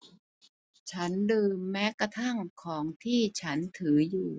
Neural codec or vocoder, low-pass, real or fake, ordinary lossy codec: none; none; real; none